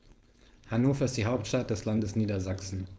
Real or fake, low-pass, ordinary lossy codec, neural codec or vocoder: fake; none; none; codec, 16 kHz, 4.8 kbps, FACodec